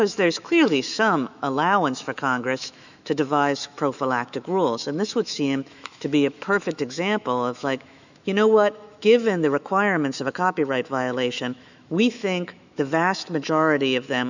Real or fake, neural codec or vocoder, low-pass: fake; autoencoder, 48 kHz, 128 numbers a frame, DAC-VAE, trained on Japanese speech; 7.2 kHz